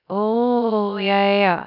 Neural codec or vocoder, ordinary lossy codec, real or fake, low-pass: codec, 16 kHz, 0.3 kbps, FocalCodec; none; fake; 5.4 kHz